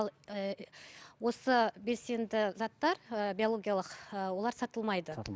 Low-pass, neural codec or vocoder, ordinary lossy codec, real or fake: none; none; none; real